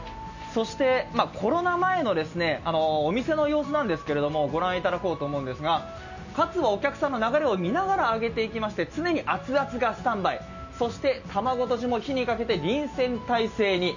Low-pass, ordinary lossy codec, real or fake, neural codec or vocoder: 7.2 kHz; none; real; none